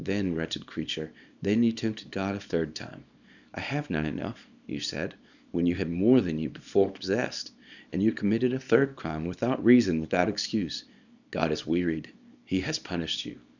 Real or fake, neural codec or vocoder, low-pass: fake; codec, 24 kHz, 0.9 kbps, WavTokenizer, small release; 7.2 kHz